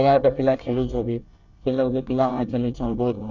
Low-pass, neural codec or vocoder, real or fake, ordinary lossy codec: 7.2 kHz; codec, 24 kHz, 1 kbps, SNAC; fake; none